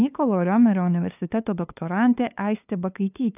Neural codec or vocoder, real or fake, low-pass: codec, 16 kHz, 2 kbps, FunCodec, trained on LibriTTS, 25 frames a second; fake; 3.6 kHz